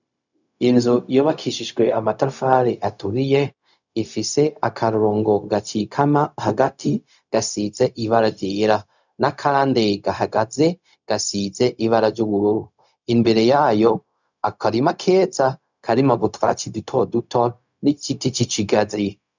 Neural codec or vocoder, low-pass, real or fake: codec, 16 kHz, 0.4 kbps, LongCat-Audio-Codec; 7.2 kHz; fake